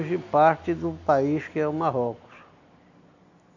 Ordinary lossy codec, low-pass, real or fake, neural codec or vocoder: none; 7.2 kHz; real; none